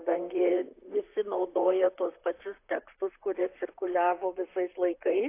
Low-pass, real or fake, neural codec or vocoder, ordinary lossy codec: 3.6 kHz; fake; vocoder, 44.1 kHz, 128 mel bands, Pupu-Vocoder; AAC, 24 kbps